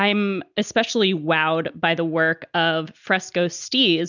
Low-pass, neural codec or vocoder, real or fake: 7.2 kHz; none; real